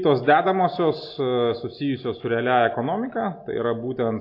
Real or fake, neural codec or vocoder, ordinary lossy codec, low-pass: real; none; AAC, 32 kbps; 5.4 kHz